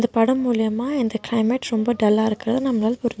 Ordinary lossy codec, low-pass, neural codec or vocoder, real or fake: none; none; none; real